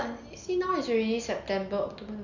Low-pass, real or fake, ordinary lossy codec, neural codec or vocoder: 7.2 kHz; real; none; none